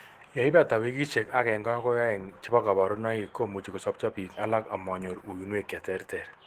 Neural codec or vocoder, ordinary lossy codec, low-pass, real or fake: autoencoder, 48 kHz, 128 numbers a frame, DAC-VAE, trained on Japanese speech; Opus, 16 kbps; 14.4 kHz; fake